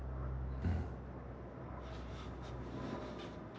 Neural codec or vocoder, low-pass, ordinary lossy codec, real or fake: none; none; none; real